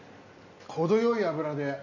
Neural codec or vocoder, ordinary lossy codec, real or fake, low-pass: none; none; real; 7.2 kHz